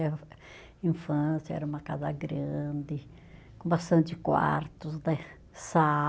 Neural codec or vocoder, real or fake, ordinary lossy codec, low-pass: none; real; none; none